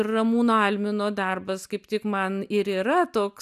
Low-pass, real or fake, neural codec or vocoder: 14.4 kHz; real; none